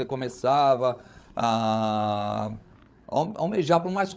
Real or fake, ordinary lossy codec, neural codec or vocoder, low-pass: fake; none; codec, 16 kHz, 8 kbps, FreqCodec, larger model; none